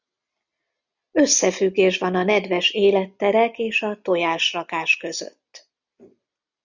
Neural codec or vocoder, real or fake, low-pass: none; real; 7.2 kHz